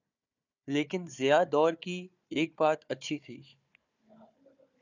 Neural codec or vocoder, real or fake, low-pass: codec, 16 kHz, 4 kbps, FunCodec, trained on Chinese and English, 50 frames a second; fake; 7.2 kHz